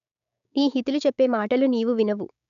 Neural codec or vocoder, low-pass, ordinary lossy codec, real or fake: codec, 16 kHz, 6 kbps, DAC; 7.2 kHz; AAC, 96 kbps; fake